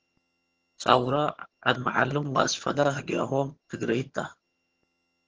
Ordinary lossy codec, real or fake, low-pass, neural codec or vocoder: Opus, 16 kbps; fake; 7.2 kHz; vocoder, 22.05 kHz, 80 mel bands, HiFi-GAN